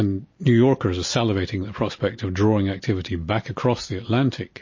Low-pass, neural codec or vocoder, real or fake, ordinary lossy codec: 7.2 kHz; none; real; MP3, 32 kbps